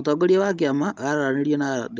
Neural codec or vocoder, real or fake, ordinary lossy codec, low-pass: none; real; Opus, 32 kbps; 7.2 kHz